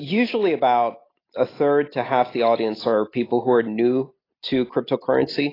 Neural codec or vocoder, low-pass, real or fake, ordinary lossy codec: none; 5.4 kHz; real; AAC, 24 kbps